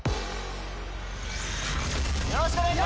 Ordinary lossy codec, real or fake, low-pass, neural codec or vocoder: none; real; none; none